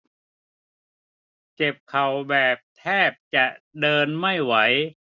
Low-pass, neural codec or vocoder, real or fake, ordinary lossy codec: 7.2 kHz; none; real; none